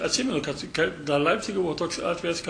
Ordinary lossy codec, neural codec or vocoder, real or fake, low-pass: AAC, 48 kbps; none; real; 9.9 kHz